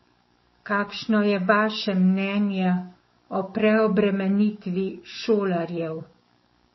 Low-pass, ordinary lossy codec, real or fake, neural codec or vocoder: 7.2 kHz; MP3, 24 kbps; fake; codec, 44.1 kHz, 7.8 kbps, DAC